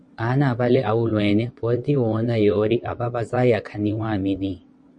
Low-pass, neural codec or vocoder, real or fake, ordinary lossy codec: 9.9 kHz; vocoder, 22.05 kHz, 80 mel bands, WaveNeXt; fake; MP3, 48 kbps